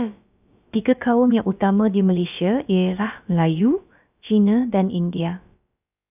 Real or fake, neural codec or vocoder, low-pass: fake; codec, 16 kHz, about 1 kbps, DyCAST, with the encoder's durations; 3.6 kHz